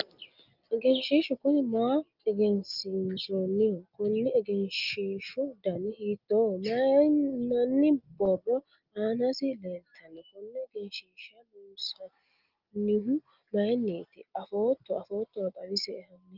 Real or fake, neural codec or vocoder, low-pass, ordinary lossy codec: real; none; 5.4 kHz; Opus, 24 kbps